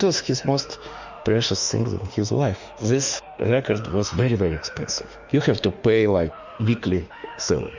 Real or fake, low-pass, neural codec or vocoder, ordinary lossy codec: fake; 7.2 kHz; autoencoder, 48 kHz, 32 numbers a frame, DAC-VAE, trained on Japanese speech; Opus, 64 kbps